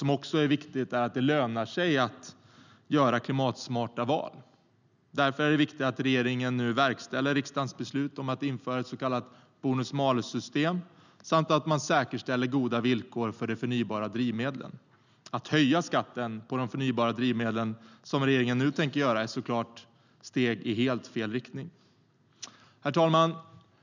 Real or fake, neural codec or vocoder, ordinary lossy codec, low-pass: real; none; none; 7.2 kHz